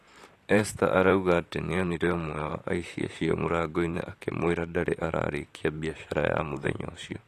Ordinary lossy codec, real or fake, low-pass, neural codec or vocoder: AAC, 48 kbps; fake; 14.4 kHz; vocoder, 44.1 kHz, 128 mel bands, Pupu-Vocoder